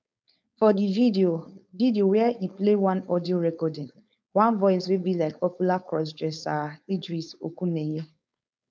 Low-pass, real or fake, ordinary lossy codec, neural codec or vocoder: none; fake; none; codec, 16 kHz, 4.8 kbps, FACodec